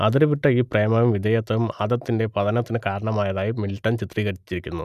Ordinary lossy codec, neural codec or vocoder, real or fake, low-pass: none; none; real; 14.4 kHz